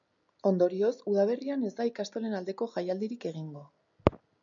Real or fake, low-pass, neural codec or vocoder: real; 7.2 kHz; none